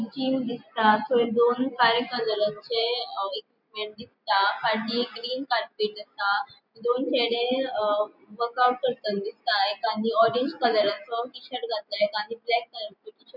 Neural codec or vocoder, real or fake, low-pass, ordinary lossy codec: none; real; 5.4 kHz; none